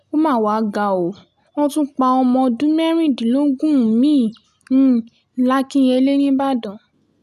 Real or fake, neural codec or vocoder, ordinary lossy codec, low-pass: real; none; none; 14.4 kHz